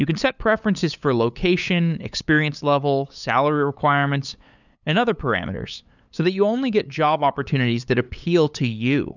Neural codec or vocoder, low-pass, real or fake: codec, 16 kHz, 8 kbps, FunCodec, trained on LibriTTS, 25 frames a second; 7.2 kHz; fake